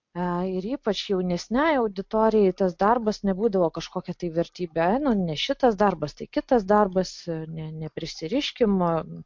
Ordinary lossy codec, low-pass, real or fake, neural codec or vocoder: MP3, 48 kbps; 7.2 kHz; real; none